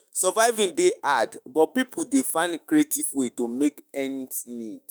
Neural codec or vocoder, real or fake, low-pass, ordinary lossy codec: autoencoder, 48 kHz, 32 numbers a frame, DAC-VAE, trained on Japanese speech; fake; none; none